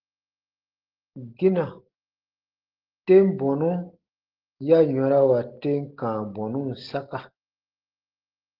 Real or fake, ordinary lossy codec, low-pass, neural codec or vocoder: real; Opus, 16 kbps; 5.4 kHz; none